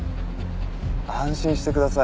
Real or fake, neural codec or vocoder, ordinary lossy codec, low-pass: real; none; none; none